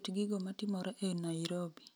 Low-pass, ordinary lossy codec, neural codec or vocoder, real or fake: none; none; none; real